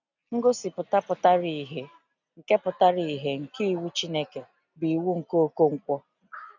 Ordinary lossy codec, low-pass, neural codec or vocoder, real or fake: none; 7.2 kHz; none; real